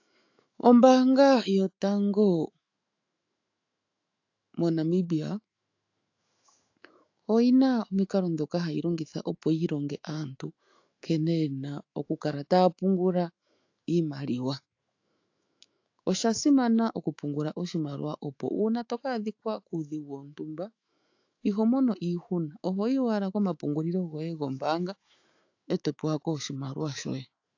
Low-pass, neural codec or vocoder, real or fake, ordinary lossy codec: 7.2 kHz; autoencoder, 48 kHz, 128 numbers a frame, DAC-VAE, trained on Japanese speech; fake; AAC, 48 kbps